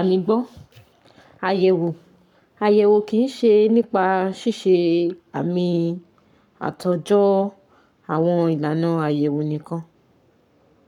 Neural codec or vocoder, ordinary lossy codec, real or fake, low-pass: codec, 44.1 kHz, 7.8 kbps, Pupu-Codec; none; fake; 19.8 kHz